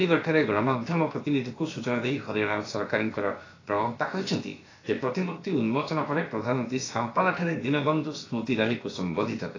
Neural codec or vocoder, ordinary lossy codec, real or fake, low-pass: codec, 16 kHz, about 1 kbps, DyCAST, with the encoder's durations; AAC, 32 kbps; fake; 7.2 kHz